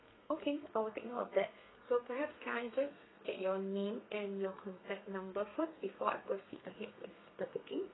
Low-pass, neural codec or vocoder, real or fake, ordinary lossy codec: 7.2 kHz; codec, 32 kHz, 1.9 kbps, SNAC; fake; AAC, 16 kbps